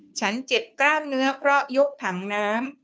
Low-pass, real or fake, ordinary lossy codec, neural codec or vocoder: none; fake; none; codec, 16 kHz, 2 kbps, FunCodec, trained on Chinese and English, 25 frames a second